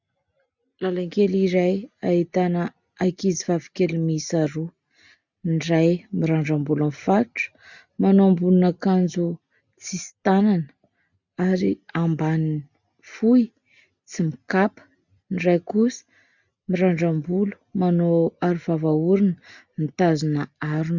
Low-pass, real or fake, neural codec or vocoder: 7.2 kHz; real; none